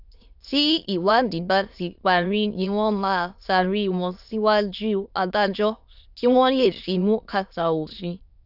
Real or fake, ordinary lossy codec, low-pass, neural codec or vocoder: fake; AAC, 48 kbps; 5.4 kHz; autoencoder, 22.05 kHz, a latent of 192 numbers a frame, VITS, trained on many speakers